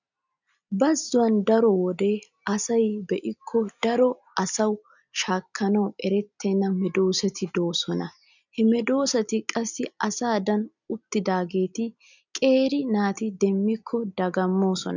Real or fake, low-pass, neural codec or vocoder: real; 7.2 kHz; none